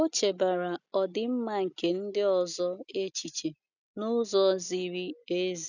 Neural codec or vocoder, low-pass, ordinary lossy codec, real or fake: none; 7.2 kHz; none; real